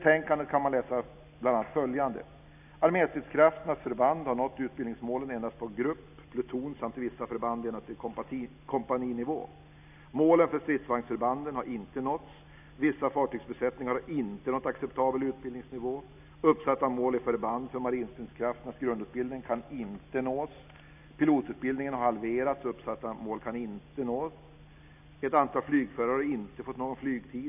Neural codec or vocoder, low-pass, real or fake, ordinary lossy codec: none; 3.6 kHz; real; none